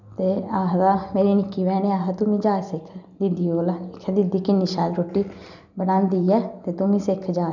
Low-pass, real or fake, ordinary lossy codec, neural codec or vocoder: 7.2 kHz; real; none; none